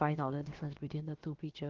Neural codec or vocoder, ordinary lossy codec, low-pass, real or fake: codec, 16 kHz, about 1 kbps, DyCAST, with the encoder's durations; Opus, 16 kbps; 7.2 kHz; fake